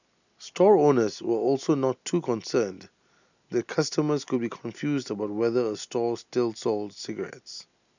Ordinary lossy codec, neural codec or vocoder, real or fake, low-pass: none; none; real; 7.2 kHz